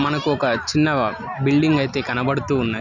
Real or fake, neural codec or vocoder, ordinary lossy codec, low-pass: real; none; none; 7.2 kHz